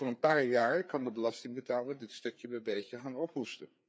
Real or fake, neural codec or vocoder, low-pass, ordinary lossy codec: fake; codec, 16 kHz, 4 kbps, FreqCodec, larger model; none; none